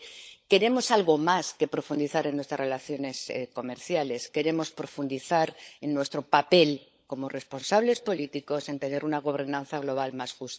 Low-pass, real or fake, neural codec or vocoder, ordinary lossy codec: none; fake; codec, 16 kHz, 16 kbps, FunCodec, trained on LibriTTS, 50 frames a second; none